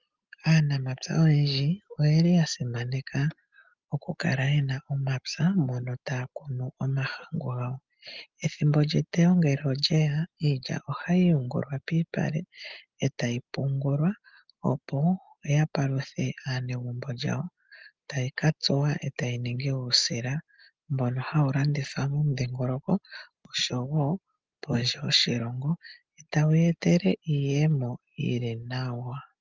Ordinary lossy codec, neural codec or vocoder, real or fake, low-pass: Opus, 24 kbps; none; real; 7.2 kHz